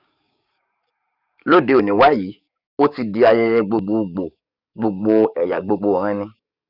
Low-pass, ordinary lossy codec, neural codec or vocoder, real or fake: 5.4 kHz; Opus, 64 kbps; codec, 44.1 kHz, 7.8 kbps, DAC; fake